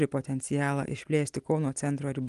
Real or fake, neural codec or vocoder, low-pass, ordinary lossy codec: real; none; 10.8 kHz; Opus, 24 kbps